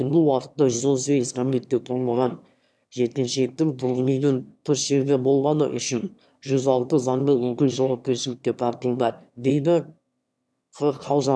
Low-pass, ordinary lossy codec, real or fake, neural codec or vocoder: none; none; fake; autoencoder, 22.05 kHz, a latent of 192 numbers a frame, VITS, trained on one speaker